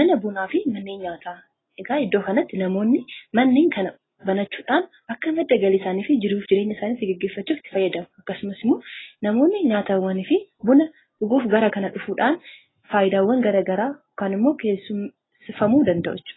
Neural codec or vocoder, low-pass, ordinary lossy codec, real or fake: none; 7.2 kHz; AAC, 16 kbps; real